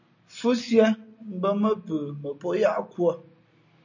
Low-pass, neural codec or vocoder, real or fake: 7.2 kHz; none; real